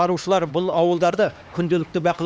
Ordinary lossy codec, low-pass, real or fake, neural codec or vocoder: none; none; fake; codec, 16 kHz, 2 kbps, X-Codec, HuBERT features, trained on LibriSpeech